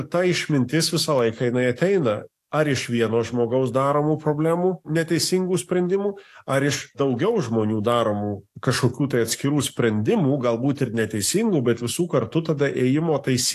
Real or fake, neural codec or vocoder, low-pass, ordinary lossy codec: fake; codec, 44.1 kHz, 7.8 kbps, DAC; 14.4 kHz; AAC, 64 kbps